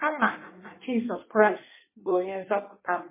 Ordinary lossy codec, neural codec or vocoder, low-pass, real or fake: MP3, 16 kbps; codec, 16 kHz in and 24 kHz out, 1.1 kbps, FireRedTTS-2 codec; 3.6 kHz; fake